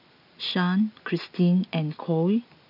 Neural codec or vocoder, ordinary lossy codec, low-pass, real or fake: none; none; 5.4 kHz; real